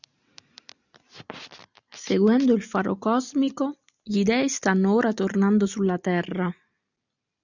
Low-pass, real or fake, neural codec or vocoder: 7.2 kHz; real; none